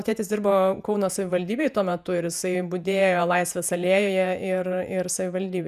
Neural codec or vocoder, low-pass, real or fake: vocoder, 48 kHz, 128 mel bands, Vocos; 14.4 kHz; fake